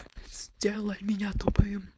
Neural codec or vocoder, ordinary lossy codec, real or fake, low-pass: codec, 16 kHz, 4.8 kbps, FACodec; none; fake; none